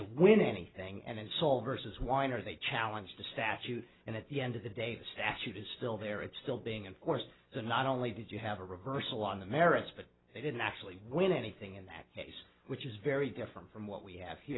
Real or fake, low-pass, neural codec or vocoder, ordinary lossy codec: real; 7.2 kHz; none; AAC, 16 kbps